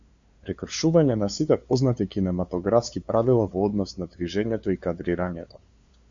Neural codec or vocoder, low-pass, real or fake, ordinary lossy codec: codec, 16 kHz, 2 kbps, FunCodec, trained on LibriTTS, 25 frames a second; 7.2 kHz; fake; Opus, 64 kbps